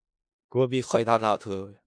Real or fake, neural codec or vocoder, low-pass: fake; codec, 16 kHz in and 24 kHz out, 0.4 kbps, LongCat-Audio-Codec, four codebook decoder; 9.9 kHz